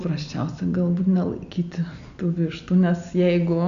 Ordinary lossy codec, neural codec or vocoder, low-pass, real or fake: AAC, 96 kbps; none; 7.2 kHz; real